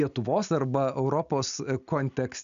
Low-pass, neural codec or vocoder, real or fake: 7.2 kHz; none; real